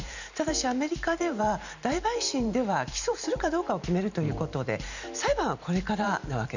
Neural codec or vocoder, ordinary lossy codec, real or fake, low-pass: vocoder, 44.1 kHz, 128 mel bands every 512 samples, BigVGAN v2; none; fake; 7.2 kHz